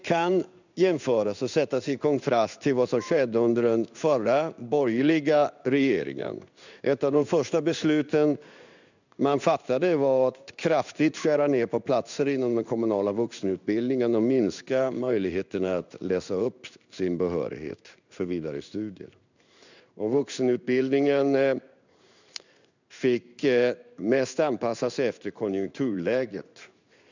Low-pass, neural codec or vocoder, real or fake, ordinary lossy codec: 7.2 kHz; codec, 16 kHz in and 24 kHz out, 1 kbps, XY-Tokenizer; fake; none